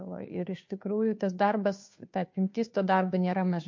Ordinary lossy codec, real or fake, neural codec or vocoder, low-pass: MP3, 48 kbps; fake; codec, 16 kHz, 2 kbps, FunCodec, trained on Chinese and English, 25 frames a second; 7.2 kHz